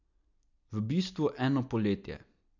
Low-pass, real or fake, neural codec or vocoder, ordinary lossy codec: 7.2 kHz; real; none; none